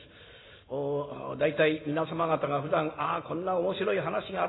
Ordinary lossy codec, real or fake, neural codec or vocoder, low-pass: AAC, 16 kbps; real; none; 7.2 kHz